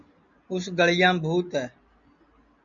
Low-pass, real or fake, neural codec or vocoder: 7.2 kHz; real; none